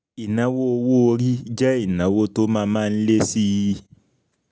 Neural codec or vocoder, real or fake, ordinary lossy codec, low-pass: none; real; none; none